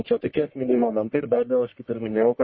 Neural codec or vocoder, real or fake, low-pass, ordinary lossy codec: codec, 44.1 kHz, 1.7 kbps, Pupu-Codec; fake; 7.2 kHz; MP3, 24 kbps